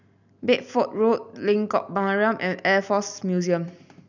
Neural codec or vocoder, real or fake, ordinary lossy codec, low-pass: none; real; none; 7.2 kHz